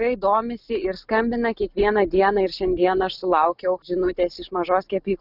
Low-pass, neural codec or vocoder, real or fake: 5.4 kHz; none; real